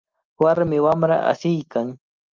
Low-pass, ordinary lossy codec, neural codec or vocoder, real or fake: 7.2 kHz; Opus, 24 kbps; none; real